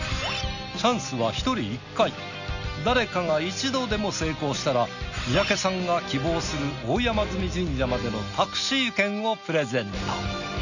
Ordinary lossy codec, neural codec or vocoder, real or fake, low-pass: none; none; real; 7.2 kHz